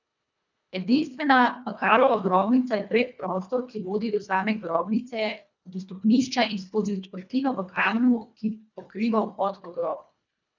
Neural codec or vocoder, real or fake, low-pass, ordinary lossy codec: codec, 24 kHz, 1.5 kbps, HILCodec; fake; 7.2 kHz; none